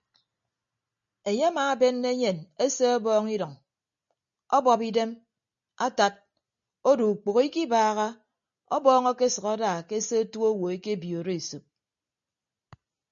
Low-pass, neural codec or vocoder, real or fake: 7.2 kHz; none; real